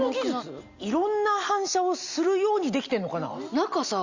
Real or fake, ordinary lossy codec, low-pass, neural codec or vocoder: real; Opus, 64 kbps; 7.2 kHz; none